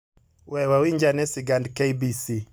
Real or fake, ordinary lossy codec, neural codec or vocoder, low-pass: real; none; none; none